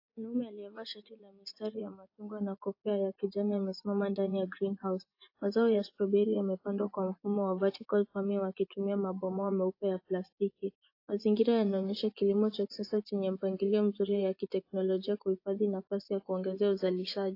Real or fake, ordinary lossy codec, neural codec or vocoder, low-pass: fake; AAC, 32 kbps; vocoder, 24 kHz, 100 mel bands, Vocos; 5.4 kHz